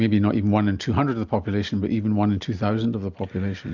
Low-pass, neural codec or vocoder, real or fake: 7.2 kHz; none; real